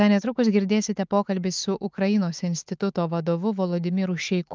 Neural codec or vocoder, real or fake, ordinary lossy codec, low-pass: autoencoder, 48 kHz, 128 numbers a frame, DAC-VAE, trained on Japanese speech; fake; Opus, 32 kbps; 7.2 kHz